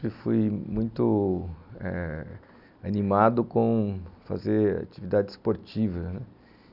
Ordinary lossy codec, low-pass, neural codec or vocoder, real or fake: none; 5.4 kHz; none; real